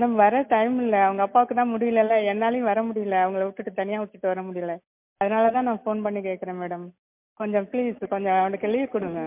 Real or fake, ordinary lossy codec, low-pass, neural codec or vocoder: real; MP3, 32 kbps; 3.6 kHz; none